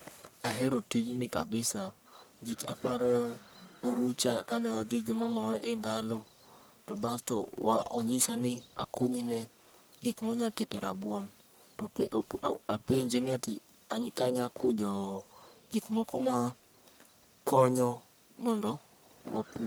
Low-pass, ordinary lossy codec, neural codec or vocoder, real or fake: none; none; codec, 44.1 kHz, 1.7 kbps, Pupu-Codec; fake